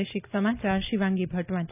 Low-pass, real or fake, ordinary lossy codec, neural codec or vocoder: 3.6 kHz; real; none; none